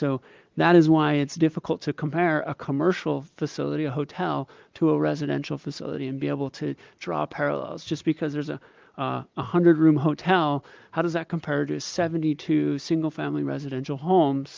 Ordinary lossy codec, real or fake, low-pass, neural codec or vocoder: Opus, 24 kbps; real; 7.2 kHz; none